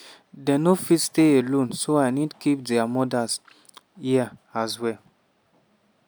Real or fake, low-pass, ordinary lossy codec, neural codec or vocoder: real; none; none; none